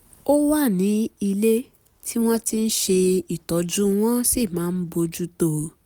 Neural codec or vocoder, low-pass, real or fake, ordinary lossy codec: none; none; real; none